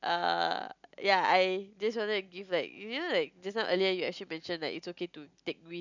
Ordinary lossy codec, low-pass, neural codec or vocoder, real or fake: none; 7.2 kHz; none; real